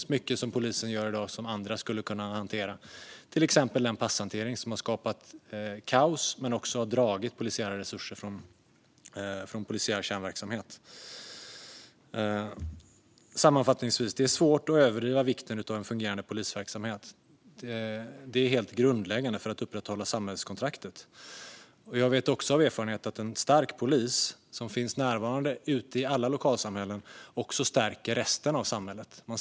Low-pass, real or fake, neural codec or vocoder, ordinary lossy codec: none; real; none; none